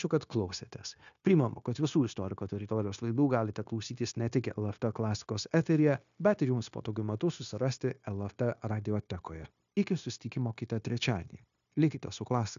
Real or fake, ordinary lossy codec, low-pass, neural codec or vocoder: fake; MP3, 96 kbps; 7.2 kHz; codec, 16 kHz, 0.9 kbps, LongCat-Audio-Codec